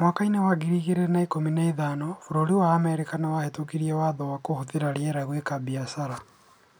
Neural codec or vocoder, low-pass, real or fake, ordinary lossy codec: none; none; real; none